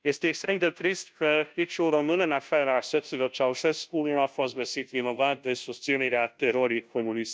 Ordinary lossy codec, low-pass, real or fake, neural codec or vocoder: none; none; fake; codec, 16 kHz, 0.5 kbps, FunCodec, trained on Chinese and English, 25 frames a second